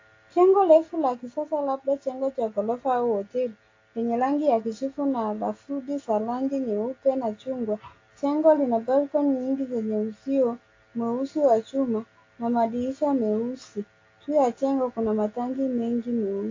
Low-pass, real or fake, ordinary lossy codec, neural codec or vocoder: 7.2 kHz; real; AAC, 32 kbps; none